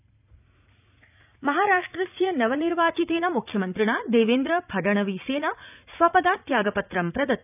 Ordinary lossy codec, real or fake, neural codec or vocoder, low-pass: none; fake; vocoder, 44.1 kHz, 80 mel bands, Vocos; 3.6 kHz